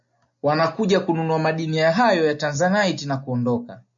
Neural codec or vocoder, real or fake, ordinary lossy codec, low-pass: none; real; MP3, 64 kbps; 7.2 kHz